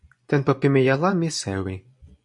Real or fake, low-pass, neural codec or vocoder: fake; 10.8 kHz; vocoder, 24 kHz, 100 mel bands, Vocos